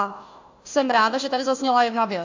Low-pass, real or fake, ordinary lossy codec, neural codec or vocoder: 7.2 kHz; fake; AAC, 48 kbps; codec, 16 kHz, 1 kbps, FunCodec, trained on Chinese and English, 50 frames a second